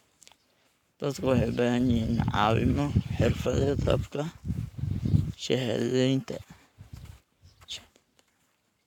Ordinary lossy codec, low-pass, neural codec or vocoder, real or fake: none; 19.8 kHz; codec, 44.1 kHz, 7.8 kbps, Pupu-Codec; fake